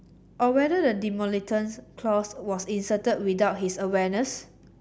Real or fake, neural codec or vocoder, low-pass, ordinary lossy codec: real; none; none; none